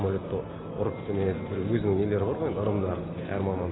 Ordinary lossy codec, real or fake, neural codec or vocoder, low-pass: AAC, 16 kbps; real; none; 7.2 kHz